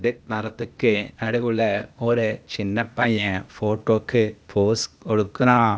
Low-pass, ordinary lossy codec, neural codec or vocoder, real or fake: none; none; codec, 16 kHz, 0.8 kbps, ZipCodec; fake